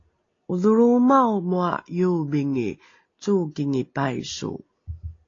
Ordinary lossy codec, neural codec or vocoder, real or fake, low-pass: AAC, 32 kbps; none; real; 7.2 kHz